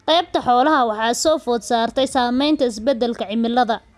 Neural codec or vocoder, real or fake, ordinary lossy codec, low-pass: none; real; none; none